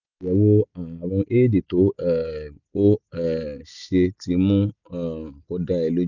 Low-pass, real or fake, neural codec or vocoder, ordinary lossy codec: 7.2 kHz; real; none; none